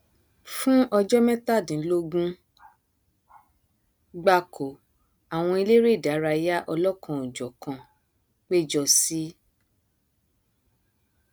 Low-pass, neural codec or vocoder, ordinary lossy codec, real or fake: none; none; none; real